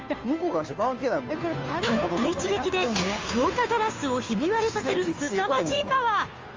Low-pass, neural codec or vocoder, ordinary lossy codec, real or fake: 7.2 kHz; codec, 16 kHz, 2 kbps, FunCodec, trained on Chinese and English, 25 frames a second; Opus, 32 kbps; fake